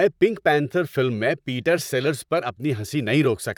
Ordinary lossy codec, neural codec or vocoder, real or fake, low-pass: none; vocoder, 44.1 kHz, 128 mel bands, Pupu-Vocoder; fake; 19.8 kHz